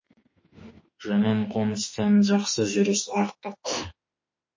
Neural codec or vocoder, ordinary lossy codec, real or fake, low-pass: autoencoder, 48 kHz, 32 numbers a frame, DAC-VAE, trained on Japanese speech; MP3, 32 kbps; fake; 7.2 kHz